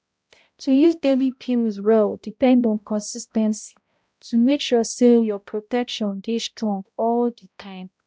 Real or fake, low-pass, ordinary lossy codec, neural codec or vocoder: fake; none; none; codec, 16 kHz, 0.5 kbps, X-Codec, HuBERT features, trained on balanced general audio